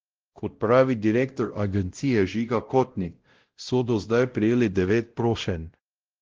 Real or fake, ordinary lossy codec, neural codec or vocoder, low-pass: fake; Opus, 16 kbps; codec, 16 kHz, 0.5 kbps, X-Codec, WavLM features, trained on Multilingual LibriSpeech; 7.2 kHz